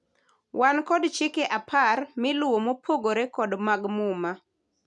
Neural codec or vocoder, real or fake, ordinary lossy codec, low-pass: none; real; none; 10.8 kHz